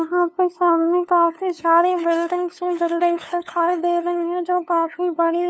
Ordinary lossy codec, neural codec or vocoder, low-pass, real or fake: none; codec, 16 kHz, 2 kbps, FunCodec, trained on LibriTTS, 25 frames a second; none; fake